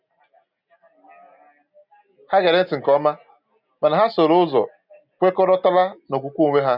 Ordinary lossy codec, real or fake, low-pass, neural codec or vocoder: none; real; 5.4 kHz; none